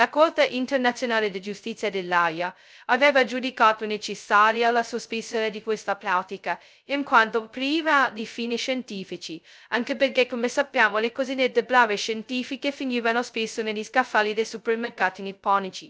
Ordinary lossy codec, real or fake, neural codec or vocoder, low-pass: none; fake; codec, 16 kHz, 0.2 kbps, FocalCodec; none